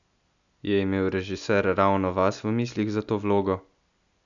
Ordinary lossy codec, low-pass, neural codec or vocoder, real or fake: none; 7.2 kHz; none; real